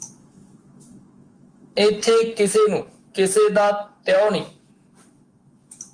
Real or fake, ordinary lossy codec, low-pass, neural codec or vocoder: fake; Opus, 24 kbps; 9.9 kHz; autoencoder, 48 kHz, 128 numbers a frame, DAC-VAE, trained on Japanese speech